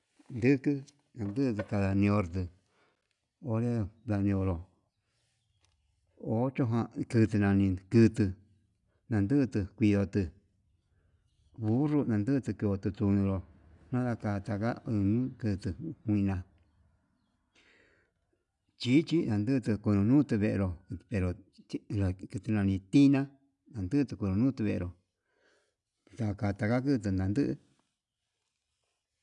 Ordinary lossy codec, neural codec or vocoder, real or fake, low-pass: none; none; real; 10.8 kHz